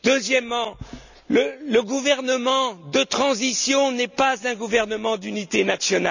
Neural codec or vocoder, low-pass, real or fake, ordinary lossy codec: none; 7.2 kHz; real; none